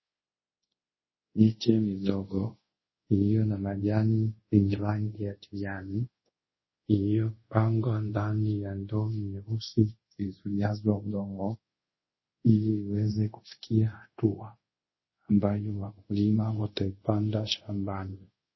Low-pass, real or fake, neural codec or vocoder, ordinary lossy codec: 7.2 kHz; fake; codec, 24 kHz, 0.5 kbps, DualCodec; MP3, 24 kbps